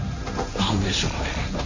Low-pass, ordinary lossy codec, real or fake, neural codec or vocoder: 7.2 kHz; none; fake; codec, 16 kHz, 1.1 kbps, Voila-Tokenizer